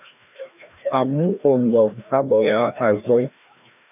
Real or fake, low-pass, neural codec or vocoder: fake; 3.6 kHz; codec, 16 kHz, 1 kbps, FreqCodec, larger model